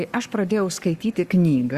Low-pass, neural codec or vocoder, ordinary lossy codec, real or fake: 14.4 kHz; codec, 44.1 kHz, 7.8 kbps, Pupu-Codec; Opus, 64 kbps; fake